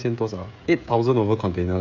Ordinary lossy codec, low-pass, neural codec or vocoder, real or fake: none; 7.2 kHz; codec, 16 kHz, 16 kbps, FreqCodec, smaller model; fake